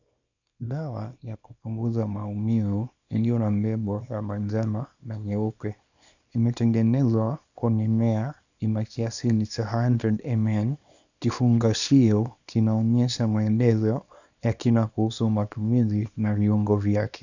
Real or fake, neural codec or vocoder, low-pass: fake; codec, 24 kHz, 0.9 kbps, WavTokenizer, small release; 7.2 kHz